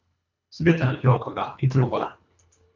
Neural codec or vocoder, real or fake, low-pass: codec, 24 kHz, 1.5 kbps, HILCodec; fake; 7.2 kHz